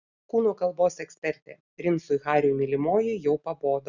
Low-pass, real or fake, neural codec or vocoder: 7.2 kHz; real; none